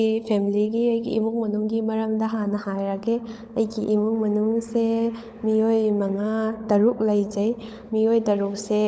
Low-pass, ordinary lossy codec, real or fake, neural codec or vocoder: none; none; fake; codec, 16 kHz, 16 kbps, FunCodec, trained on LibriTTS, 50 frames a second